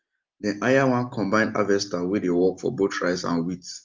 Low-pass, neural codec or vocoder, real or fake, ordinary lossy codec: 7.2 kHz; none; real; Opus, 24 kbps